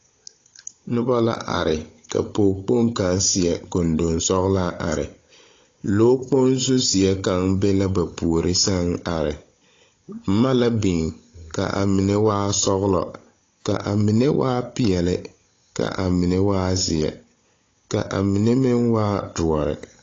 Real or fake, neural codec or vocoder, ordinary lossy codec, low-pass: fake; codec, 16 kHz, 16 kbps, FunCodec, trained on Chinese and English, 50 frames a second; AAC, 32 kbps; 7.2 kHz